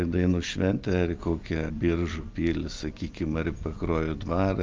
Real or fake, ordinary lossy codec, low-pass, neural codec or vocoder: real; Opus, 24 kbps; 7.2 kHz; none